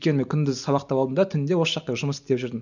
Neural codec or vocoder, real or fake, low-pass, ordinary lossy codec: none; real; 7.2 kHz; none